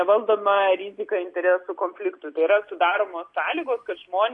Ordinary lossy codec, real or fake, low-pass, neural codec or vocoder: AAC, 64 kbps; real; 10.8 kHz; none